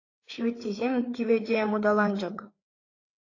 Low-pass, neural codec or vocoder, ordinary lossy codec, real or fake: 7.2 kHz; codec, 16 kHz, 4 kbps, FreqCodec, larger model; AAC, 32 kbps; fake